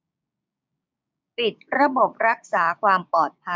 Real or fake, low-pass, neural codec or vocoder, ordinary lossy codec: fake; none; codec, 16 kHz, 6 kbps, DAC; none